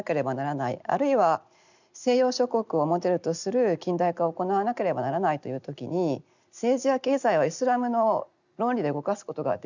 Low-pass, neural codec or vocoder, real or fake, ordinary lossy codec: 7.2 kHz; none; real; none